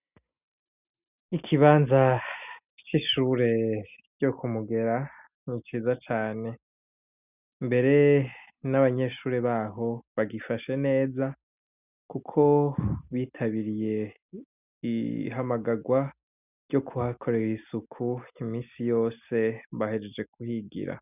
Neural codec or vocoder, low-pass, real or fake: none; 3.6 kHz; real